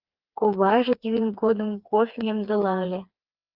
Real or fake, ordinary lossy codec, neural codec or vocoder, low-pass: fake; Opus, 32 kbps; codec, 16 kHz, 4 kbps, FreqCodec, smaller model; 5.4 kHz